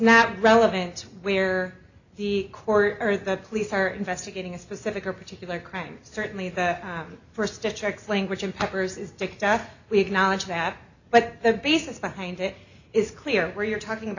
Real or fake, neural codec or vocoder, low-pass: real; none; 7.2 kHz